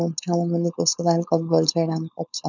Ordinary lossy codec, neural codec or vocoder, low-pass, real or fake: none; codec, 16 kHz, 4.8 kbps, FACodec; 7.2 kHz; fake